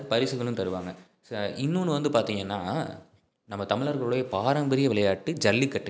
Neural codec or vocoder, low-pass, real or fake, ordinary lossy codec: none; none; real; none